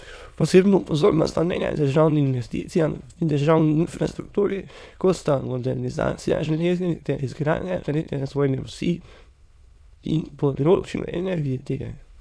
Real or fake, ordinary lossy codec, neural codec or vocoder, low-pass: fake; none; autoencoder, 22.05 kHz, a latent of 192 numbers a frame, VITS, trained on many speakers; none